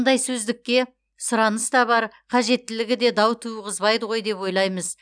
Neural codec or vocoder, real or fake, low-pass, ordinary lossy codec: none; real; 9.9 kHz; none